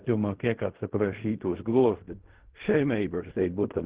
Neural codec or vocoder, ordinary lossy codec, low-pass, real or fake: codec, 16 kHz in and 24 kHz out, 0.4 kbps, LongCat-Audio-Codec, fine tuned four codebook decoder; Opus, 16 kbps; 3.6 kHz; fake